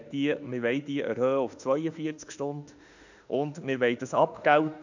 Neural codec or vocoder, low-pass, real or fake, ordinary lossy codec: autoencoder, 48 kHz, 32 numbers a frame, DAC-VAE, trained on Japanese speech; 7.2 kHz; fake; none